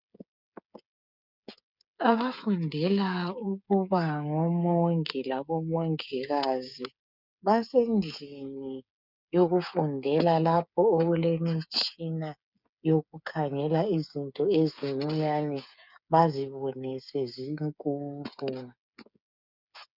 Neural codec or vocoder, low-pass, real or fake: codec, 16 kHz, 8 kbps, FreqCodec, smaller model; 5.4 kHz; fake